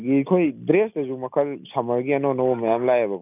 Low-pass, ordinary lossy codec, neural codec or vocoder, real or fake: 3.6 kHz; none; none; real